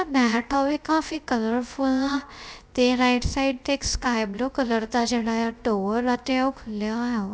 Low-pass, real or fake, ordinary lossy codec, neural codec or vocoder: none; fake; none; codec, 16 kHz, 0.3 kbps, FocalCodec